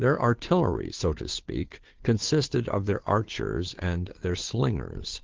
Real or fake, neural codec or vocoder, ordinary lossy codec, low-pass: fake; codec, 16 kHz, 2 kbps, FunCodec, trained on Chinese and English, 25 frames a second; Opus, 16 kbps; 7.2 kHz